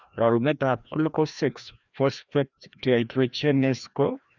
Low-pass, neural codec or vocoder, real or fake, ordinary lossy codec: 7.2 kHz; codec, 16 kHz, 1 kbps, FreqCodec, larger model; fake; none